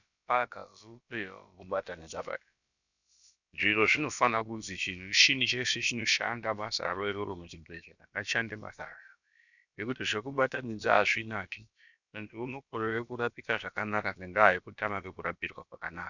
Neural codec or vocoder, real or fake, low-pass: codec, 16 kHz, about 1 kbps, DyCAST, with the encoder's durations; fake; 7.2 kHz